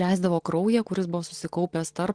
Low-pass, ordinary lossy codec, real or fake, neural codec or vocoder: 9.9 kHz; Opus, 16 kbps; real; none